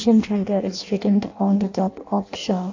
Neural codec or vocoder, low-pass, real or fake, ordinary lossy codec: codec, 16 kHz in and 24 kHz out, 0.6 kbps, FireRedTTS-2 codec; 7.2 kHz; fake; AAC, 48 kbps